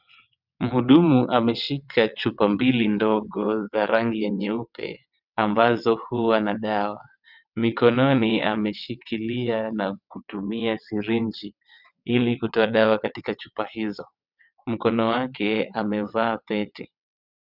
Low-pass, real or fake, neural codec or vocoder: 5.4 kHz; fake; vocoder, 22.05 kHz, 80 mel bands, WaveNeXt